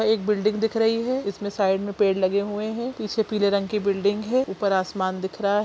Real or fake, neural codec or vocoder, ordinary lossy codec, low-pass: real; none; none; none